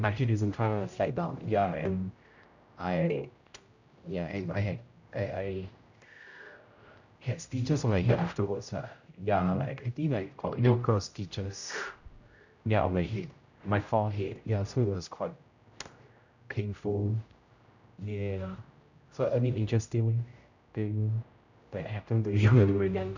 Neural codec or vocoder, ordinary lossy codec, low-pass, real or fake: codec, 16 kHz, 0.5 kbps, X-Codec, HuBERT features, trained on general audio; none; 7.2 kHz; fake